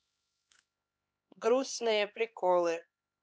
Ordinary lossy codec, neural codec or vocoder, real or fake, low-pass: none; codec, 16 kHz, 2 kbps, X-Codec, HuBERT features, trained on LibriSpeech; fake; none